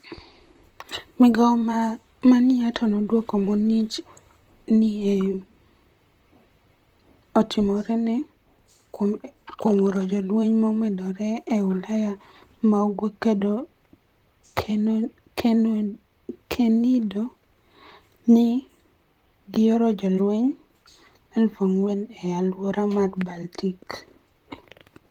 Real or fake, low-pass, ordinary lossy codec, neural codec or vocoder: fake; 19.8 kHz; Opus, 24 kbps; vocoder, 44.1 kHz, 128 mel bands, Pupu-Vocoder